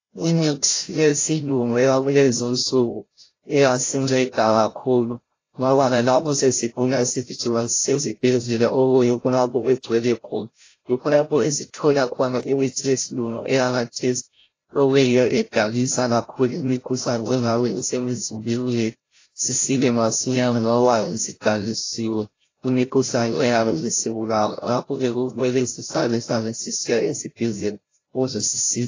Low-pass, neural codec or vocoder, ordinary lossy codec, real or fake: 7.2 kHz; codec, 16 kHz, 0.5 kbps, FreqCodec, larger model; AAC, 32 kbps; fake